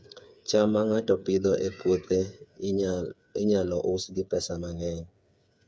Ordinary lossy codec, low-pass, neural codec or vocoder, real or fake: none; none; codec, 16 kHz, 8 kbps, FreqCodec, smaller model; fake